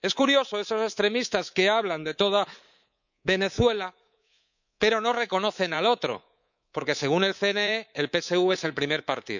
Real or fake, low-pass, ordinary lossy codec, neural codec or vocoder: fake; 7.2 kHz; none; codec, 24 kHz, 3.1 kbps, DualCodec